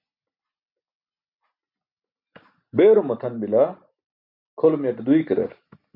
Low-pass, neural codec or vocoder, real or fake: 5.4 kHz; none; real